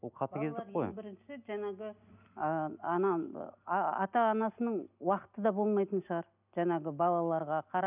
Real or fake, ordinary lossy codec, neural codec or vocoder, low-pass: real; none; none; 3.6 kHz